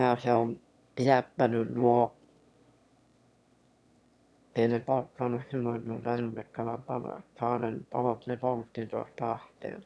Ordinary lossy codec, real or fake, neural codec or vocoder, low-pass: none; fake; autoencoder, 22.05 kHz, a latent of 192 numbers a frame, VITS, trained on one speaker; none